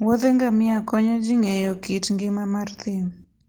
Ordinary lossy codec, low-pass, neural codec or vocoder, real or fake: Opus, 16 kbps; 19.8 kHz; none; real